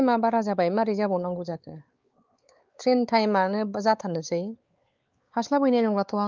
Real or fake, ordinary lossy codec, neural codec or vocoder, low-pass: fake; Opus, 24 kbps; codec, 16 kHz, 4 kbps, X-Codec, WavLM features, trained on Multilingual LibriSpeech; 7.2 kHz